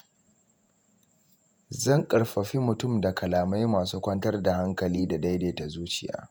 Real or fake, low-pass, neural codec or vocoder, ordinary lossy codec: fake; none; vocoder, 48 kHz, 128 mel bands, Vocos; none